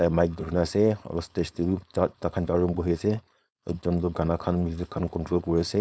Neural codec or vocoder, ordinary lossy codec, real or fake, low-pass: codec, 16 kHz, 4.8 kbps, FACodec; none; fake; none